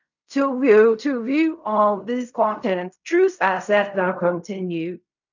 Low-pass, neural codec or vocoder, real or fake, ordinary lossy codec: 7.2 kHz; codec, 16 kHz in and 24 kHz out, 0.4 kbps, LongCat-Audio-Codec, fine tuned four codebook decoder; fake; none